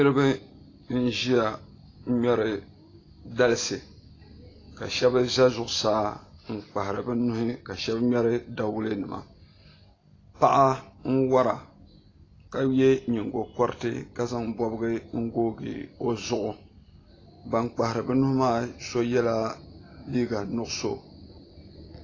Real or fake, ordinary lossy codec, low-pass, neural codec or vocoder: real; AAC, 32 kbps; 7.2 kHz; none